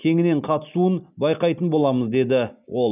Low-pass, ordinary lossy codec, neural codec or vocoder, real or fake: 3.6 kHz; none; none; real